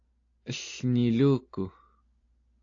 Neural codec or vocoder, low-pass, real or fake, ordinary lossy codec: none; 7.2 kHz; real; AAC, 48 kbps